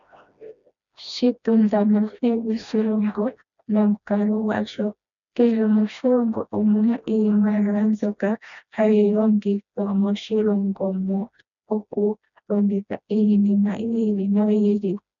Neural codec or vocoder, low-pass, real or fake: codec, 16 kHz, 1 kbps, FreqCodec, smaller model; 7.2 kHz; fake